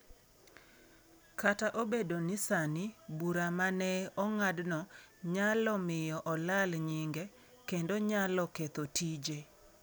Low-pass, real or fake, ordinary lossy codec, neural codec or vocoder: none; real; none; none